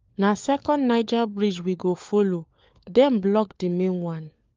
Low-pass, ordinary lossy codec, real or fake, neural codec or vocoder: 7.2 kHz; Opus, 32 kbps; fake; codec, 16 kHz, 4 kbps, FunCodec, trained on LibriTTS, 50 frames a second